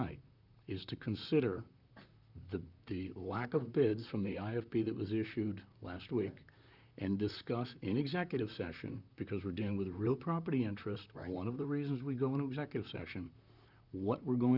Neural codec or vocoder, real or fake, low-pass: vocoder, 44.1 kHz, 128 mel bands, Pupu-Vocoder; fake; 5.4 kHz